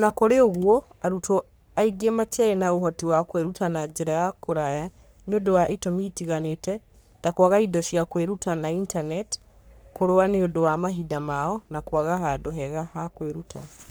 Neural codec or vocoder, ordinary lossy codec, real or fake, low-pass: codec, 44.1 kHz, 3.4 kbps, Pupu-Codec; none; fake; none